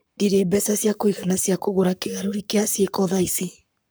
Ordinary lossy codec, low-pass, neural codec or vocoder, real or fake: none; none; codec, 44.1 kHz, 7.8 kbps, Pupu-Codec; fake